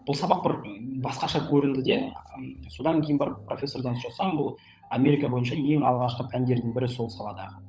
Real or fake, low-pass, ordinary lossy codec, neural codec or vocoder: fake; none; none; codec, 16 kHz, 16 kbps, FunCodec, trained on LibriTTS, 50 frames a second